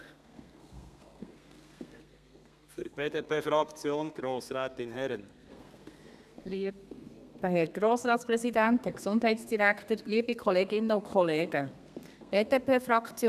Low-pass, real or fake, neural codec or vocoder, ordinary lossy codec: 14.4 kHz; fake; codec, 32 kHz, 1.9 kbps, SNAC; none